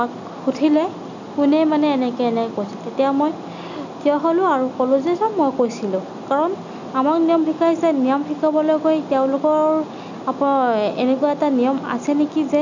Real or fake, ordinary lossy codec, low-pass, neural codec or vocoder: real; none; 7.2 kHz; none